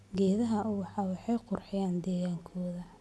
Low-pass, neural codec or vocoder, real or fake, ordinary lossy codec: none; none; real; none